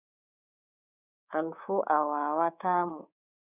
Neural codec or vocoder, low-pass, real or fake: codec, 24 kHz, 3.1 kbps, DualCodec; 3.6 kHz; fake